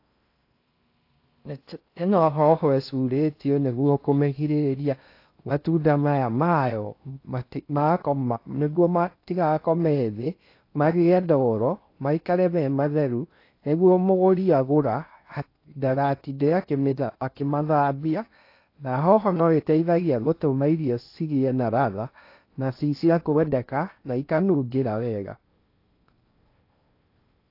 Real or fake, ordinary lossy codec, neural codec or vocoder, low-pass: fake; AAC, 32 kbps; codec, 16 kHz in and 24 kHz out, 0.6 kbps, FocalCodec, streaming, 4096 codes; 5.4 kHz